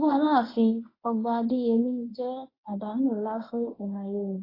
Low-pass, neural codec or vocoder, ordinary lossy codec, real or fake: 5.4 kHz; codec, 24 kHz, 0.9 kbps, WavTokenizer, medium speech release version 1; AAC, 24 kbps; fake